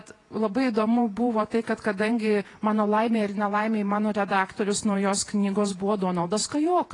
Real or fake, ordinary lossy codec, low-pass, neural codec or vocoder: fake; AAC, 32 kbps; 10.8 kHz; vocoder, 48 kHz, 128 mel bands, Vocos